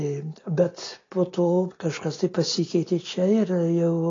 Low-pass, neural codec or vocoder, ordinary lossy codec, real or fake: 7.2 kHz; none; AAC, 32 kbps; real